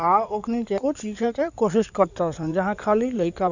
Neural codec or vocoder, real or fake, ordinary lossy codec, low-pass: codec, 16 kHz in and 24 kHz out, 2.2 kbps, FireRedTTS-2 codec; fake; none; 7.2 kHz